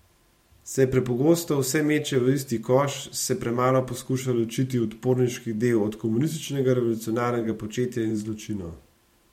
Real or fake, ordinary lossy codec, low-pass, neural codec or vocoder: fake; MP3, 64 kbps; 19.8 kHz; vocoder, 44.1 kHz, 128 mel bands every 512 samples, BigVGAN v2